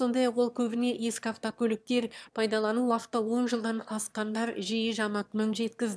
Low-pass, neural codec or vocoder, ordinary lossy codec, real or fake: none; autoencoder, 22.05 kHz, a latent of 192 numbers a frame, VITS, trained on one speaker; none; fake